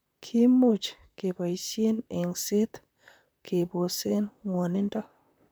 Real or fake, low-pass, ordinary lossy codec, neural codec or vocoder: fake; none; none; vocoder, 44.1 kHz, 128 mel bands, Pupu-Vocoder